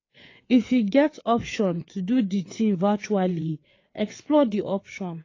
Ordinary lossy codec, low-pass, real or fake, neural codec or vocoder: AAC, 32 kbps; 7.2 kHz; fake; codec, 16 kHz, 4 kbps, FreqCodec, larger model